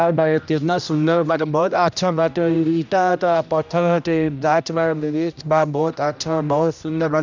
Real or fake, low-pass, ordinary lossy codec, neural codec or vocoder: fake; 7.2 kHz; none; codec, 16 kHz, 1 kbps, X-Codec, HuBERT features, trained on general audio